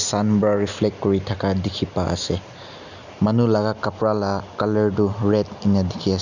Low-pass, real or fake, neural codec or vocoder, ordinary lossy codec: 7.2 kHz; real; none; none